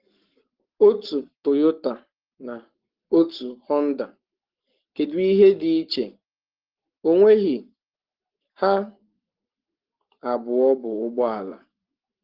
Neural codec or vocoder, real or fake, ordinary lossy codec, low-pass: codec, 44.1 kHz, 7.8 kbps, Pupu-Codec; fake; Opus, 16 kbps; 5.4 kHz